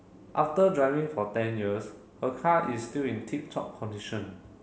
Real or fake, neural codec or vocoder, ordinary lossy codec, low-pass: real; none; none; none